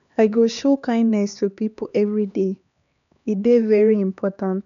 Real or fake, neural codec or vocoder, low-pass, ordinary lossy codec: fake; codec, 16 kHz, 2 kbps, X-Codec, HuBERT features, trained on LibriSpeech; 7.2 kHz; none